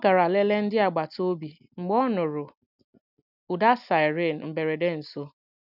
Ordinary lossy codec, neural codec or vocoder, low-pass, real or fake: none; none; 5.4 kHz; real